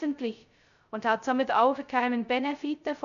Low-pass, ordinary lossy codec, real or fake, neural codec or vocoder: 7.2 kHz; none; fake; codec, 16 kHz, 0.2 kbps, FocalCodec